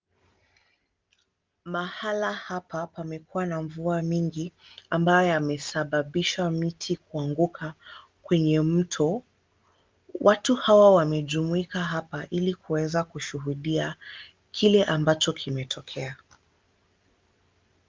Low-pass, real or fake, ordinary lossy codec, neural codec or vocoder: 7.2 kHz; real; Opus, 24 kbps; none